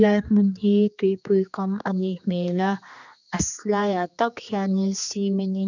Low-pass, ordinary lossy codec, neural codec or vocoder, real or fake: 7.2 kHz; none; codec, 16 kHz, 2 kbps, X-Codec, HuBERT features, trained on general audio; fake